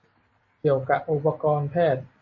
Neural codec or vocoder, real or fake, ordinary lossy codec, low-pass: none; real; MP3, 32 kbps; 7.2 kHz